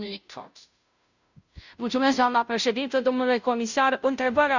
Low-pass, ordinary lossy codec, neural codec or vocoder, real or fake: 7.2 kHz; none; codec, 16 kHz, 0.5 kbps, FunCodec, trained on Chinese and English, 25 frames a second; fake